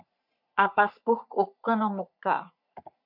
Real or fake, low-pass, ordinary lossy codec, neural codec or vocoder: fake; 5.4 kHz; MP3, 48 kbps; codec, 44.1 kHz, 3.4 kbps, Pupu-Codec